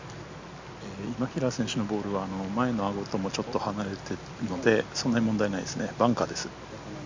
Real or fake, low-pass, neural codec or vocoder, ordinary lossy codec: real; 7.2 kHz; none; MP3, 64 kbps